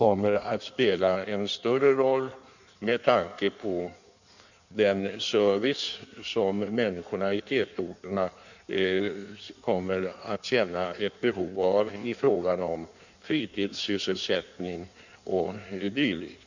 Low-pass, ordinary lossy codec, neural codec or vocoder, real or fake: 7.2 kHz; none; codec, 16 kHz in and 24 kHz out, 1.1 kbps, FireRedTTS-2 codec; fake